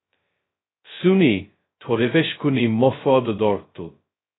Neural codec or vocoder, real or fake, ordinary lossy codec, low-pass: codec, 16 kHz, 0.2 kbps, FocalCodec; fake; AAC, 16 kbps; 7.2 kHz